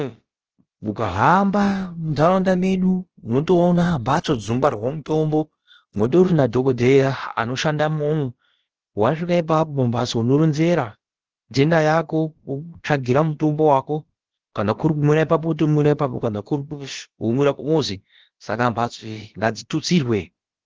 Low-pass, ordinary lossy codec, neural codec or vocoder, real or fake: 7.2 kHz; Opus, 16 kbps; codec, 16 kHz, about 1 kbps, DyCAST, with the encoder's durations; fake